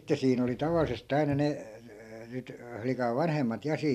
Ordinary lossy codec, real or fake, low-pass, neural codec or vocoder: none; real; 14.4 kHz; none